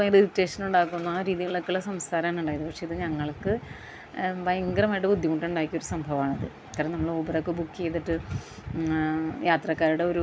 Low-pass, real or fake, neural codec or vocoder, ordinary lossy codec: none; real; none; none